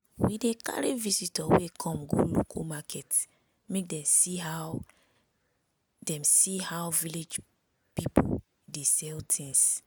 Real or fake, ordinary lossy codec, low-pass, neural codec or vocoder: real; none; none; none